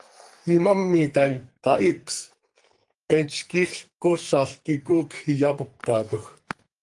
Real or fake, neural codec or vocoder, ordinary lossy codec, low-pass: fake; codec, 44.1 kHz, 2.6 kbps, SNAC; Opus, 24 kbps; 10.8 kHz